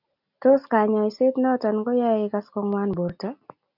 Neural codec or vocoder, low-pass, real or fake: none; 5.4 kHz; real